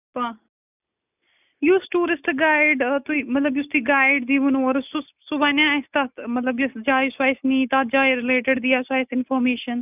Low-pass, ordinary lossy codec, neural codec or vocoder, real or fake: 3.6 kHz; none; none; real